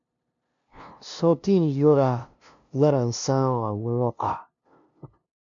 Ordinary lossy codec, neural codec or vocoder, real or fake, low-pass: MP3, 64 kbps; codec, 16 kHz, 0.5 kbps, FunCodec, trained on LibriTTS, 25 frames a second; fake; 7.2 kHz